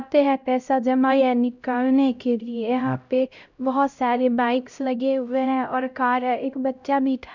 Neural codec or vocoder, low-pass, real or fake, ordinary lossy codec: codec, 16 kHz, 0.5 kbps, X-Codec, HuBERT features, trained on LibriSpeech; 7.2 kHz; fake; none